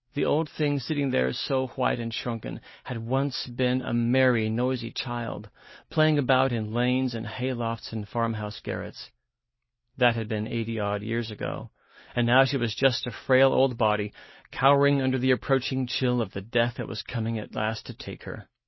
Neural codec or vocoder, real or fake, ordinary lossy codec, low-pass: none; real; MP3, 24 kbps; 7.2 kHz